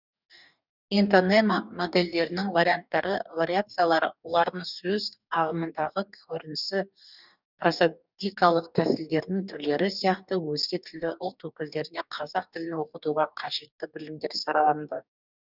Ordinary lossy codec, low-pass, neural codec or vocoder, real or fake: none; 5.4 kHz; codec, 44.1 kHz, 2.6 kbps, DAC; fake